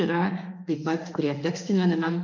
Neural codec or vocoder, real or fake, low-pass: autoencoder, 48 kHz, 32 numbers a frame, DAC-VAE, trained on Japanese speech; fake; 7.2 kHz